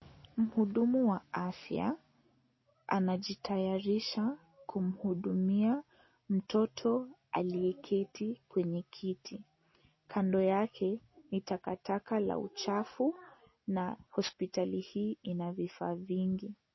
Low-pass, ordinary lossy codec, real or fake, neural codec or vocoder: 7.2 kHz; MP3, 24 kbps; real; none